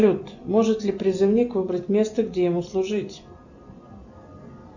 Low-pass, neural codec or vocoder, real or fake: 7.2 kHz; none; real